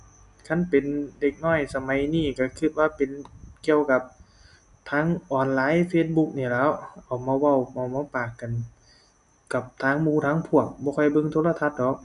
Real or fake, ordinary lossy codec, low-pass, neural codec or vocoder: real; none; 10.8 kHz; none